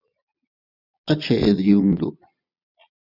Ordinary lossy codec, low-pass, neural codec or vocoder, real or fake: Opus, 64 kbps; 5.4 kHz; vocoder, 22.05 kHz, 80 mel bands, Vocos; fake